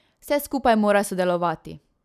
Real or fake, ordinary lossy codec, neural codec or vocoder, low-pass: real; none; none; 14.4 kHz